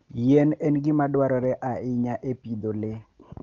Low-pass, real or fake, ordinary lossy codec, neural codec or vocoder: 7.2 kHz; real; Opus, 32 kbps; none